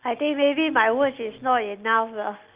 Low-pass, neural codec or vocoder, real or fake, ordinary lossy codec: 3.6 kHz; none; real; Opus, 32 kbps